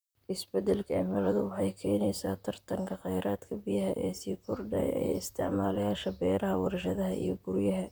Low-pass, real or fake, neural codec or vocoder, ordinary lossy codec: none; fake; vocoder, 44.1 kHz, 128 mel bands, Pupu-Vocoder; none